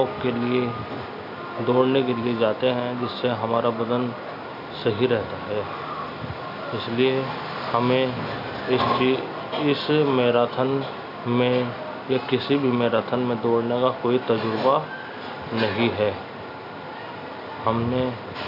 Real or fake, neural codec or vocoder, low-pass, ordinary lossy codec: real; none; 5.4 kHz; none